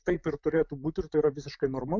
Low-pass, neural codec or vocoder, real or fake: 7.2 kHz; vocoder, 44.1 kHz, 128 mel bands, Pupu-Vocoder; fake